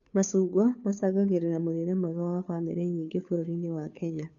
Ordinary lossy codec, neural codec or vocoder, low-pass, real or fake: none; codec, 16 kHz, 2 kbps, FunCodec, trained on Chinese and English, 25 frames a second; 7.2 kHz; fake